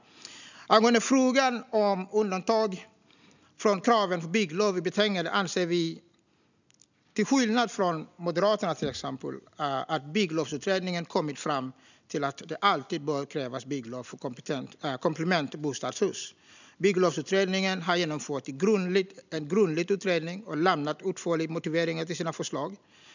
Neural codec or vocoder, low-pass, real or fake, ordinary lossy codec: none; 7.2 kHz; real; none